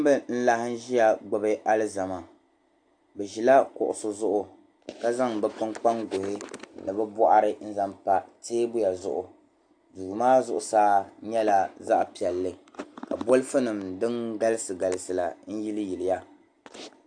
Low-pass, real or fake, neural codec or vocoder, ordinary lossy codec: 9.9 kHz; real; none; AAC, 64 kbps